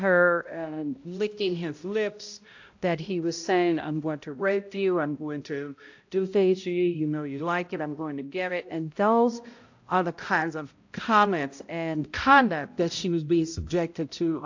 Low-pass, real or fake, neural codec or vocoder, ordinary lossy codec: 7.2 kHz; fake; codec, 16 kHz, 0.5 kbps, X-Codec, HuBERT features, trained on balanced general audio; AAC, 48 kbps